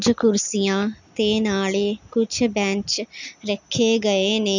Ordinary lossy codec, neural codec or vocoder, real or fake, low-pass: none; none; real; 7.2 kHz